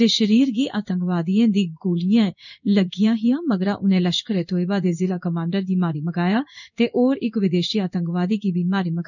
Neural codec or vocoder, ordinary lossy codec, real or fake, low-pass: codec, 16 kHz in and 24 kHz out, 1 kbps, XY-Tokenizer; none; fake; 7.2 kHz